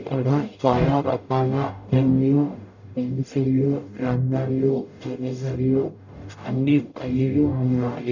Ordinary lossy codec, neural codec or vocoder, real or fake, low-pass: none; codec, 44.1 kHz, 0.9 kbps, DAC; fake; 7.2 kHz